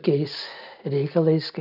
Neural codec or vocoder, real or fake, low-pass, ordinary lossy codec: none; real; 5.4 kHz; AAC, 48 kbps